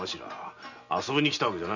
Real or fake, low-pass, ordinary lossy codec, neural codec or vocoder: real; 7.2 kHz; none; none